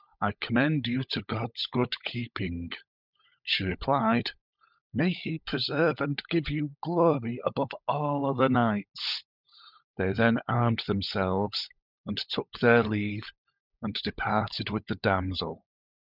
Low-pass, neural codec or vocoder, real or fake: 5.4 kHz; codec, 16 kHz, 16 kbps, FunCodec, trained on LibriTTS, 50 frames a second; fake